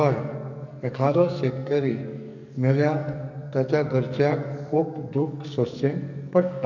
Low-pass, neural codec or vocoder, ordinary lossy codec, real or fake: 7.2 kHz; codec, 44.1 kHz, 2.6 kbps, SNAC; none; fake